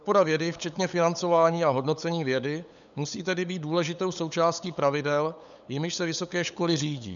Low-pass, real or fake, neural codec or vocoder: 7.2 kHz; fake; codec, 16 kHz, 8 kbps, FunCodec, trained on LibriTTS, 25 frames a second